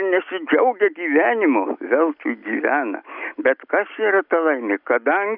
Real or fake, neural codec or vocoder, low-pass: real; none; 5.4 kHz